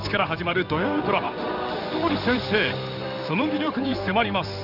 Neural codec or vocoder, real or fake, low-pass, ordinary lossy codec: codec, 16 kHz in and 24 kHz out, 1 kbps, XY-Tokenizer; fake; 5.4 kHz; none